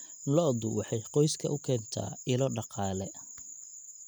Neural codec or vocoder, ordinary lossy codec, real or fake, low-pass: none; none; real; none